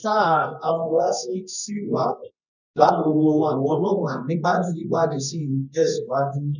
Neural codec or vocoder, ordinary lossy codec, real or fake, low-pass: codec, 24 kHz, 0.9 kbps, WavTokenizer, medium music audio release; none; fake; 7.2 kHz